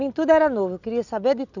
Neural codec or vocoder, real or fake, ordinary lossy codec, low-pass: none; real; none; 7.2 kHz